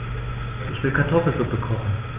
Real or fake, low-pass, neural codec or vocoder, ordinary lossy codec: real; 3.6 kHz; none; Opus, 24 kbps